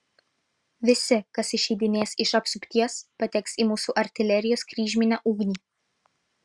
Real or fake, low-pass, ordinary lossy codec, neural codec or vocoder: real; 10.8 kHz; Opus, 64 kbps; none